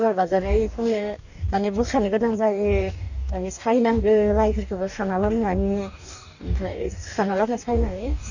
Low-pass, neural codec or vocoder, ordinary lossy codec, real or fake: 7.2 kHz; codec, 44.1 kHz, 2.6 kbps, DAC; none; fake